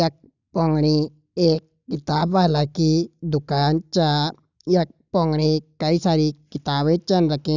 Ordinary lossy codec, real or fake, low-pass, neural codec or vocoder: none; real; 7.2 kHz; none